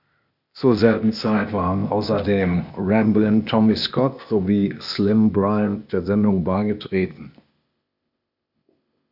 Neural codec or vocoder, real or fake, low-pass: codec, 16 kHz, 0.8 kbps, ZipCodec; fake; 5.4 kHz